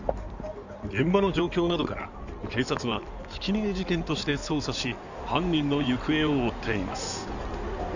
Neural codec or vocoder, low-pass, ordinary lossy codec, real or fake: codec, 16 kHz in and 24 kHz out, 2.2 kbps, FireRedTTS-2 codec; 7.2 kHz; none; fake